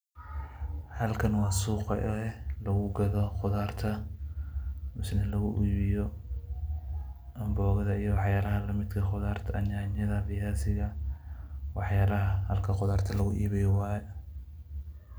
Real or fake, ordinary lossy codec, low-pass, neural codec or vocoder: real; none; none; none